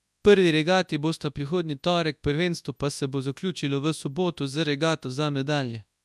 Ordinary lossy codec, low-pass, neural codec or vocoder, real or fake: none; none; codec, 24 kHz, 0.9 kbps, WavTokenizer, large speech release; fake